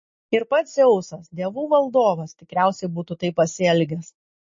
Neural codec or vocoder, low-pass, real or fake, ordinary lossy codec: none; 7.2 kHz; real; MP3, 32 kbps